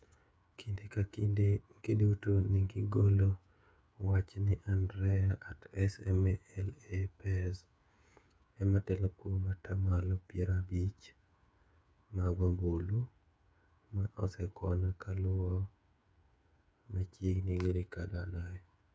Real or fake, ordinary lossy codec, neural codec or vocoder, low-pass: fake; none; codec, 16 kHz, 8 kbps, FreqCodec, smaller model; none